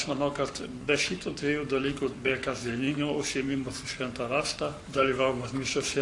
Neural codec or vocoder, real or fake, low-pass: codec, 44.1 kHz, 7.8 kbps, Pupu-Codec; fake; 10.8 kHz